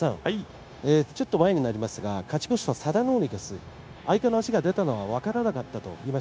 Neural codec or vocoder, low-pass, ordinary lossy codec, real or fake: codec, 16 kHz, 0.9 kbps, LongCat-Audio-Codec; none; none; fake